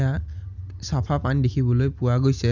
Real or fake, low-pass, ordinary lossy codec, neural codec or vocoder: real; 7.2 kHz; none; none